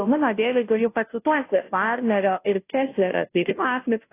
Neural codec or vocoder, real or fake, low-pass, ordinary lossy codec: codec, 16 kHz, 0.5 kbps, FunCodec, trained on Chinese and English, 25 frames a second; fake; 3.6 kHz; AAC, 24 kbps